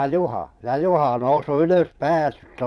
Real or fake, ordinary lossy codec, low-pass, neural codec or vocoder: fake; none; none; vocoder, 22.05 kHz, 80 mel bands, Vocos